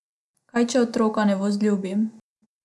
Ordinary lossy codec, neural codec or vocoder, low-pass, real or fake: none; none; none; real